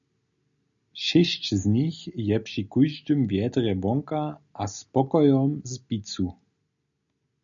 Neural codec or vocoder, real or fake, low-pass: none; real; 7.2 kHz